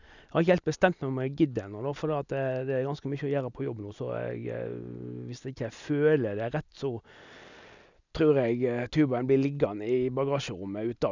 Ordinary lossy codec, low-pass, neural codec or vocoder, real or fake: none; 7.2 kHz; none; real